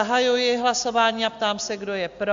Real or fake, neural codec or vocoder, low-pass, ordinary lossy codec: real; none; 7.2 kHz; AAC, 64 kbps